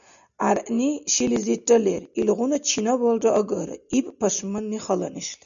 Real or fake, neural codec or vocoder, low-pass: real; none; 7.2 kHz